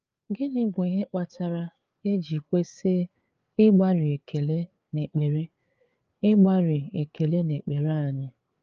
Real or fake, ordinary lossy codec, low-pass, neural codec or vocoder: fake; Opus, 24 kbps; 7.2 kHz; codec, 16 kHz, 4 kbps, FreqCodec, larger model